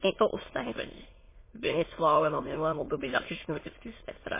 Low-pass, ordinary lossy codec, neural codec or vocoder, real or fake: 3.6 kHz; MP3, 16 kbps; autoencoder, 22.05 kHz, a latent of 192 numbers a frame, VITS, trained on many speakers; fake